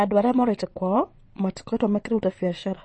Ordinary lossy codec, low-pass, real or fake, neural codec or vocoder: MP3, 32 kbps; 9.9 kHz; fake; vocoder, 22.05 kHz, 80 mel bands, WaveNeXt